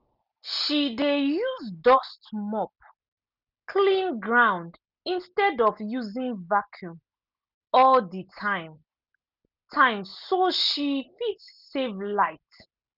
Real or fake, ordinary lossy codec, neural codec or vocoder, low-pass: real; none; none; 5.4 kHz